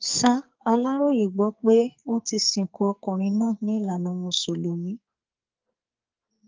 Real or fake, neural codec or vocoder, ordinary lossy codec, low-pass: fake; codec, 44.1 kHz, 2.6 kbps, SNAC; Opus, 32 kbps; 7.2 kHz